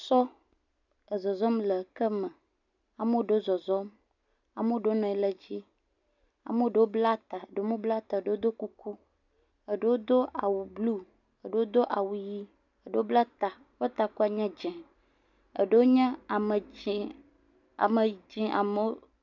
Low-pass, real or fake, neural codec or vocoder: 7.2 kHz; real; none